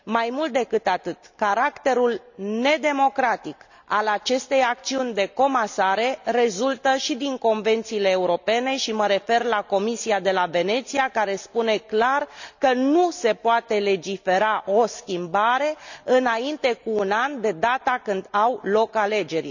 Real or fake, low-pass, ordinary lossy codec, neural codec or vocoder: real; 7.2 kHz; none; none